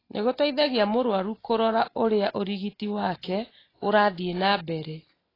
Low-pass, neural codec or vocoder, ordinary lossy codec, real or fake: 5.4 kHz; none; AAC, 24 kbps; real